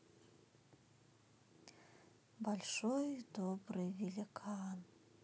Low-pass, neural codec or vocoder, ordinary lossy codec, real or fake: none; none; none; real